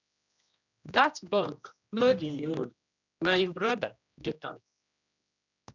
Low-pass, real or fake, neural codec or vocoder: 7.2 kHz; fake; codec, 16 kHz, 1 kbps, X-Codec, HuBERT features, trained on general audio